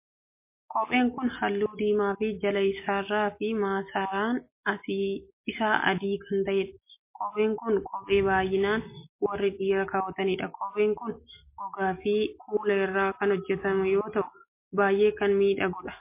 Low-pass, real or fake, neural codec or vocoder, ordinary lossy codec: 3.6 kHz; real; none; MP3, 24 kbps